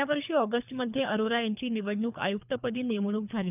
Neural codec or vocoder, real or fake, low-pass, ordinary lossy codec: codec, 24 kHz, 3 kbps, HILCodec; fake; 3.6 kHz; none